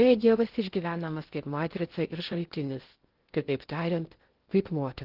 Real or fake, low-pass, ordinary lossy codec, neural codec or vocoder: fake; 5.4 kHz; Opus, 16 kbps; codec, 16 kHz in and 24 kHz out, 0.6 kbps, FocalCodec, streaming, 2048 codes